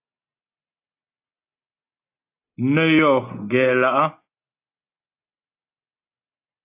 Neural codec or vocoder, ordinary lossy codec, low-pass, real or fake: none; AAC, 24 kbps; 3.6 kHz; real